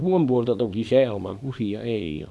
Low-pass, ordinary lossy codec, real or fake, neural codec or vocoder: none; none; fake; codec, 24 kHz, 0.9 kbps, WavTokenizer, small release